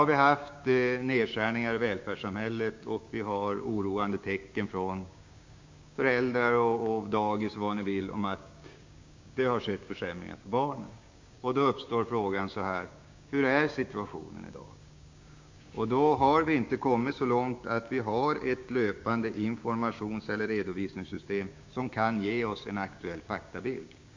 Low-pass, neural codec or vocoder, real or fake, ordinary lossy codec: 7.2 kHz; codec, 16 kHz, 6 kbps, DAC; fake; MP3, 48 kbps